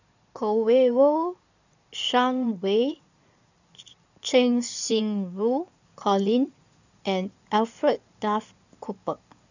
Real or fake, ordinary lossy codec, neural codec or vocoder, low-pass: fake; none; codec, 16 kHz in and 24 kHz out, 2.2 kbps, FireRedTTS-2 codec; 7.2 kHz